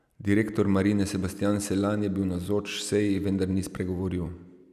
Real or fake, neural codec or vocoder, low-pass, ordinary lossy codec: real; none; 14.4 kHz; none